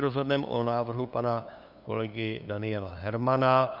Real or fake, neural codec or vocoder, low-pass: fake; codec, 16 kHz, 2 kbps, FunCodec, trained on LibriTTS, 25 frames a second; 5.4 kHz